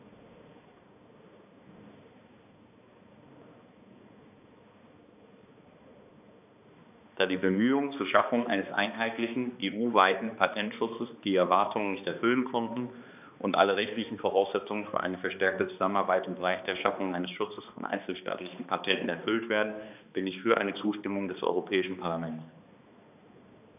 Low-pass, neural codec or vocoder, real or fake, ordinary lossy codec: 3.6 kHz; codec, 16 kHz, 2 kbps, X-Codec, HuBERT features, trained on balanced general audio; fake; none